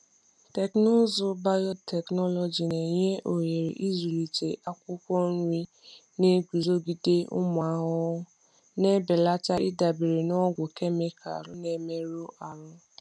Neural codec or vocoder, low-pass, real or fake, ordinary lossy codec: none; none; real; none